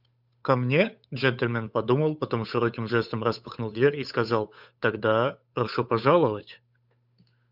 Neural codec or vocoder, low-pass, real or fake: codec, 16 kHz, 8 kbps, FunCodec, trained on Chinese and English, 25 frames a second; 5.4 kHz; fake